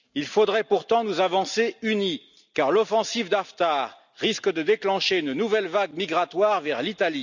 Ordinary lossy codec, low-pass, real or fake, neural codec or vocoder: none; 7.2 kHz; real; none